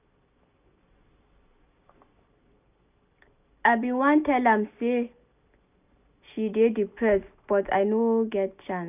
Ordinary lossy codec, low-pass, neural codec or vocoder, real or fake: none; 3.6 kHz; none; real